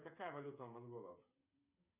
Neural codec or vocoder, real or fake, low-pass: codec, 16 kHz, 8 kbps, FreqCodec, smaller model; fake; 3.6 kHz